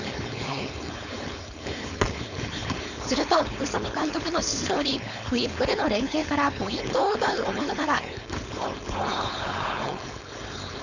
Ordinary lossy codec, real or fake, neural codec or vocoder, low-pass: none; fake; codec, 16 kHz, 4.8 kbps, FACodec; 7.2 kHz